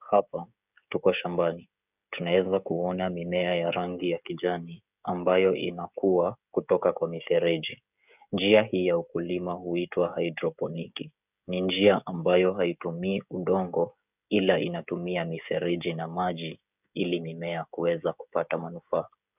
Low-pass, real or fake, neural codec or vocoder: 3.6 kHz; fake; codec, 16 kHz, 16 kbps, FreqCodec, smaller model